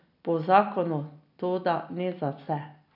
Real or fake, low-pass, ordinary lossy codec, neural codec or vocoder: real; 5.4 kHz; none; none